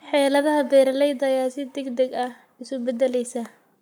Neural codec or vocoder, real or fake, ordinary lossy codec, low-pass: codec, 44.1 kHz, 7.8 kbps, Pupu-Codec; fake; none; none